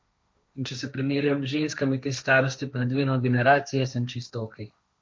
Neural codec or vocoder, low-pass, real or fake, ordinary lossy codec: codec, 16 kHz, 1.1 kbps, Voila-Tokenizer; none; fake; none